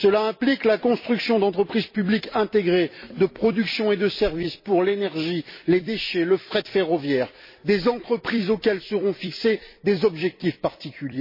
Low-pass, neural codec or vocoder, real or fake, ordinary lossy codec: 5.4 kHz; vocoder, 44.1 kHz, 128 mel bands every 256 samples, BigVGAN v2; fake; MP3, 24 kbps